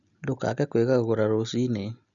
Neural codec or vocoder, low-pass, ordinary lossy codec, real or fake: none; 7.2 kHz; none; real